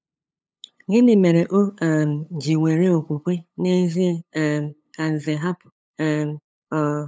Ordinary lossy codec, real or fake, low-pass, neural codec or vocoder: none; fake; none; codec, 16 kHz, 8 kbps, FunCodec, trained on LibriTTS, 25 frames a second